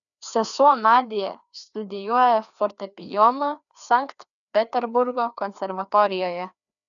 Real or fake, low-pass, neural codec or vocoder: fake; 7.2 kHz; codec, 16 kHz, 2 kbps, FreqCodec, larger model